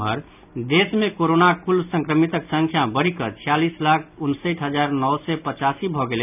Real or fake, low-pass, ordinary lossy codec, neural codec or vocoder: real; 3.6 kHz; none; none